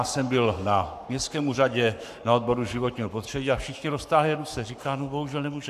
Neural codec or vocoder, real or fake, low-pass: codec, 44.1 kHz, 7.8 kbps, Pupu-Codec; fake; 14.4 kHz